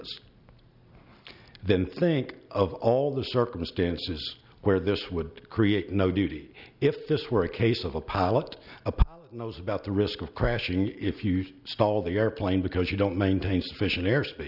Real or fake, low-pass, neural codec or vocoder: real; 5.4 kHz; none